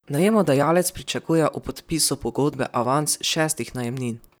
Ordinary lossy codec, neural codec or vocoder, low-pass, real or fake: none; vocoder, 44.1 kHz, 128 mel bands, Pupu-Vocoder; none; fake